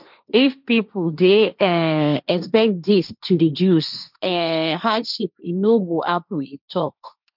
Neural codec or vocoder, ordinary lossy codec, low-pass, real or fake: codec, 16 kHz, 1.1 kbps, Voila-Tokenizer; none; 5.4 kHz; fake